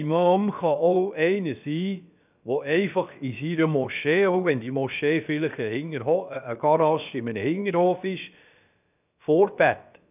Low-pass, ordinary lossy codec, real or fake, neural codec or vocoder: 3.6 kHz; none; fake; codec, 16 kHz, about 1 kbps, DyCAST, with the encoder's durations